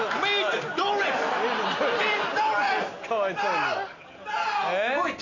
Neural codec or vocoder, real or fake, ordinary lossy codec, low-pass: none; real; none; 7.2 kHz